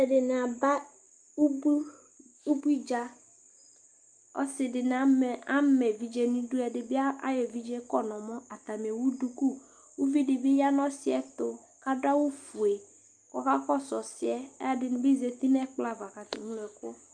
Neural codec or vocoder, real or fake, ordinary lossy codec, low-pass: none; real; Opus, 32 kbps; 9.9 kHz